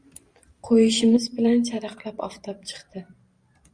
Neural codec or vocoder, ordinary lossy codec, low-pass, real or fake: vocoder, 44.1 kHz, 128 mel bands every 512 samples, BigVGAN v2; Opus, 64 kbps; 9.9 kHz; fake